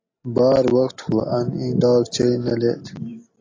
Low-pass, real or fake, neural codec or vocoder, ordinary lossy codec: 7.2 kHz; real; none; AAC, 32 kbps